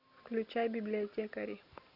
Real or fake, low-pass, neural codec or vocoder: real; 5.4 kHz; none